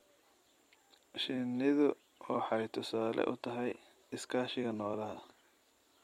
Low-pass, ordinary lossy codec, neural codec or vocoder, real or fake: 19.8 kHz; MP3, 64 kbps; vocoder, 44.1 kHz, 128 mel bands every 256 samples, BigVGAN v2; fake